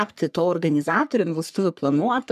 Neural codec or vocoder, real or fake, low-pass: codec, 44.1 kHz, 3.4 kbps, Pupu-Codec; fake; 14.4 kHz